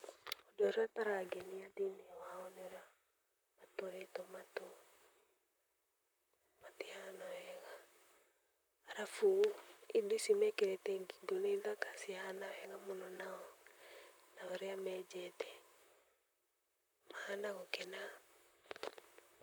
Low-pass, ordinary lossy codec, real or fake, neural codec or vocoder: none; none; fake; vocoder, 44.1 kHz, 128 mel bands, Pupu-Vocoder